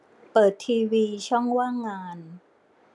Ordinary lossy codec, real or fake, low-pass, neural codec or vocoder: none; real; none; none